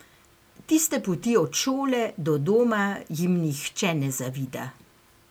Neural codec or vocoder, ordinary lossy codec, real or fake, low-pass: none; none; real; none